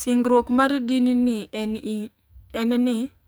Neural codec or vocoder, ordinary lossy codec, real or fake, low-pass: codec, 44.1 kHz, 2.6 kbps, SNAC; none; fake; none